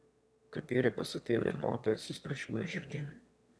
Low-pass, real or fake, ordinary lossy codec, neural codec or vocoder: none; fake; none; autoencoder, 22.05 kHz, a latent of 192 numbers a frame, VITS, trained on one speaker